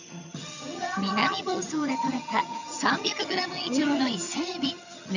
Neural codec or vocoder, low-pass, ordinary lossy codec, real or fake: vocoder, 22.05 kHz, 80 mel bands, HiFi-GAN; 7.2 kHz; none; fake